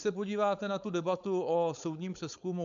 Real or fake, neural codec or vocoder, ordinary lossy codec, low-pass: fake; codec, 16 kHz, 4.8 kbps, FACodec; MP3, 64 kbps; 7.2 kHz